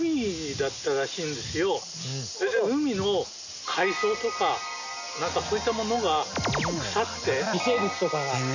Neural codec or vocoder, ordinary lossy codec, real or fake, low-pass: none; none; real; 7.2 kHz